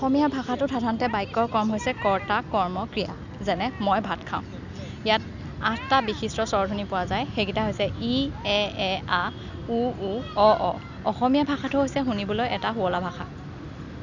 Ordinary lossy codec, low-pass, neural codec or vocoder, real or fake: none; 7.2 kHz; none; real